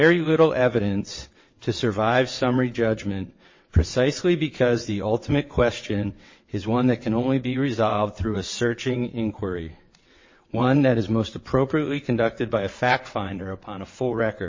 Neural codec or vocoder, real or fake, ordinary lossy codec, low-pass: vocoder, 22.05 kHz, 80 mel bands, WaveNeXt; fake; MP3, 32 kbps; 7.2 kHz